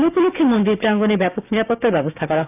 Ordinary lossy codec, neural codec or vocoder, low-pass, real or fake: none; codec, 16 kHz, 6 kbps, DAC; 3.6 kHz; fake